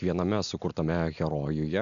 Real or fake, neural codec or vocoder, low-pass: real; none; 7.2 kHz